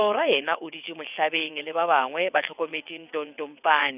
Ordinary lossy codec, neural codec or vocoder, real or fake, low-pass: none; vocoder, 22.05 kHz, 80 mel bands, WaveNeXt; fake; 3.6 kHz